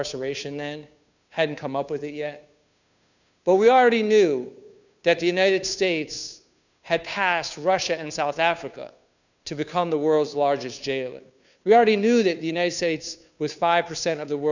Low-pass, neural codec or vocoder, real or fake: 7.2 kHz; codec, 16 kHz, 2 kbps, FunCodec, trained on Chinese and English, 25 frames a second; fake